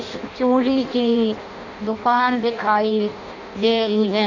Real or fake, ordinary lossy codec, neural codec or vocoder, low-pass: fake; none; codec, 16 kHz in and 24 kHz out, 0.6 kbps, FireRedTTS-2 codec; 7.2 kHz